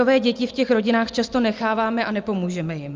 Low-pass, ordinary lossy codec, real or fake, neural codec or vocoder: 7.2 kHz; Opus, 24 kbps; real; none